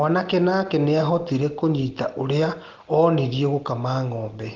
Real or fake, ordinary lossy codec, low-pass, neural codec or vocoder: real; Opus, 16 kbps; 7.2 kHz; none